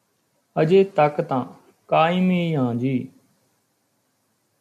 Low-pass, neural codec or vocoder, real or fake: 14.4 kHz; none; real